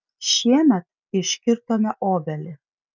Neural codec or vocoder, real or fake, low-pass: none; real; 7.2 kHz